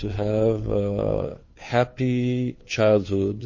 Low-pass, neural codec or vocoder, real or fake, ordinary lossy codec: 7.2 kHz; codec, 16 kHz, 2 kbps, FunCodec, trained on Chinese and English, 25 frames a second; fake; MP3, 32 kbps